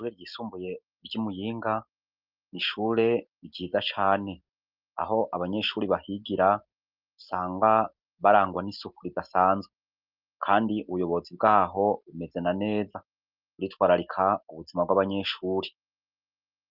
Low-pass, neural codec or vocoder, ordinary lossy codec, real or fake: 5.4 kHz; none; Opus, 24 kbps; real